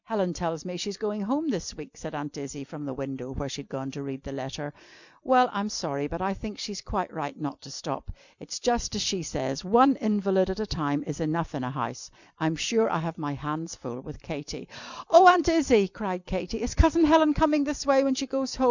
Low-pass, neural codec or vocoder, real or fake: 7.2 kHz; vocoder, 44.1 kHz, 128 mel bands every 256 samples, BigVGAN v2; fake